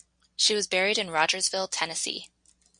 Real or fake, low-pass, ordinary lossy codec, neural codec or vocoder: real; 9.9 kHz; Opus, 64 kbps; none